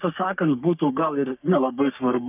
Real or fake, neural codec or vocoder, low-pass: fake; codec, 44.1 kHz, 3.4 kbps, Pupu-Codec; 3.6 kHz